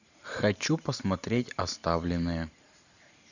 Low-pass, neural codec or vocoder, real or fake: 7.2 kHz; vocoder, 44.1 kHz, 128 mel bands every 512 samples, BigVGAN v2; fake